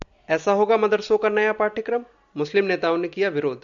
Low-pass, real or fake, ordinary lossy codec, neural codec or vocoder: 7.2 kHz; real; MP3, 96 kbps; none